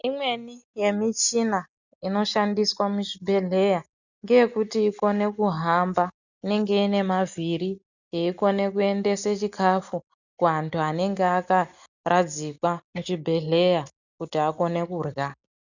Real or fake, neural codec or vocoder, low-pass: real; none; 7.2 kHz